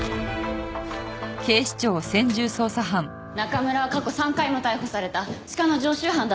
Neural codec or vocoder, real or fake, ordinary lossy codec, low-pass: none; real; none; none